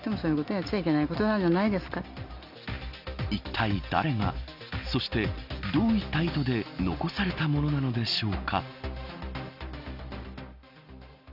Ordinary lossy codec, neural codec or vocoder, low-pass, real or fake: Opus, 64 kbps; none; 5.4 kHz; real